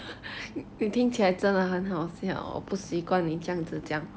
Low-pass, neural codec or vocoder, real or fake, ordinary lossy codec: none; none; real; none